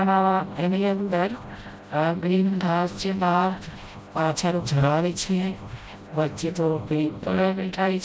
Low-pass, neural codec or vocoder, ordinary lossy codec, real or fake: none; codec, 16 kHz, 0.5 kbps, FreqCodec, smaller model; none; fake